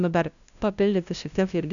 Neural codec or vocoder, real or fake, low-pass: codec, 16 kHz, 0.5 kbps, FunCodec, trained on LibriTTS, 25 frames a second; fake; 7.2 kHz